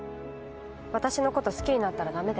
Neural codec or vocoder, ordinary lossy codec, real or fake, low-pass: none; none; real; none